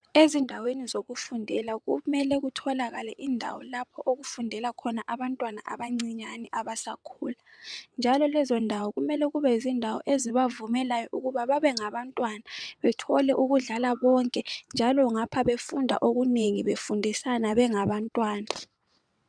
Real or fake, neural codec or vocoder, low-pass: fake; vocoder, 22.05 kHz, 80 mel bands, WaveNeXt; 9.9 kHz